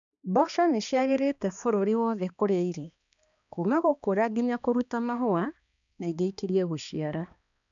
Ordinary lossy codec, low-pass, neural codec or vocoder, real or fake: none; 7.2 kHz; codec, 16 kHz, 2 kbps, X-Codec, HuBERT features, trained on balanced general audio; fake